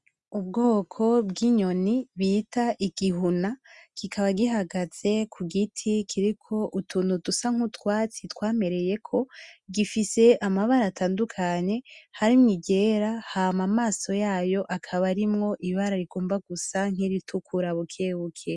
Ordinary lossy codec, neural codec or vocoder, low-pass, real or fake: Opus, 64 kbps; none; 10.8 kHz; real